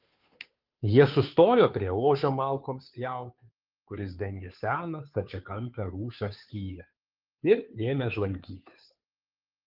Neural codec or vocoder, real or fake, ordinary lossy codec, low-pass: codec, 16 kHz, 2 kbps, FunCodec, trained on Chinese and English, 25 frames a second; fake; Opus, 32 kbps; 5.4 kHz